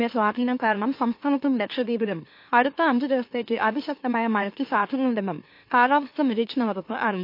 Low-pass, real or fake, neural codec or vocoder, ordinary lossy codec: 5.4 kHz; fake; autoencoder, 44.1 kHz, a latent of 192 numbers a frame, MeloTTS; MP3, 32 kbps